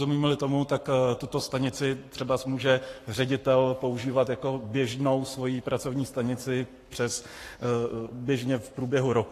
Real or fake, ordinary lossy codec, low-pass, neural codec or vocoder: fake; AAC, 48 kbps; 14.4 kHz; codec, 44.1 kHz, 7.8 kbps, Pupu-Codec